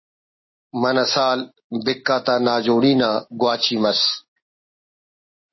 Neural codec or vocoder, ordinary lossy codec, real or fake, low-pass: none; MP3, 24 kbps; real; 7.2 kHz